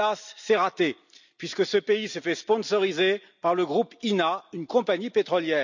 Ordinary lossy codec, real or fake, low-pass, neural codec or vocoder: none; real; 7.2 kHz; none